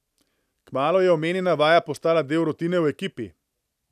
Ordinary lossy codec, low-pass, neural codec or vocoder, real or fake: none; 14.4 kHz; none; real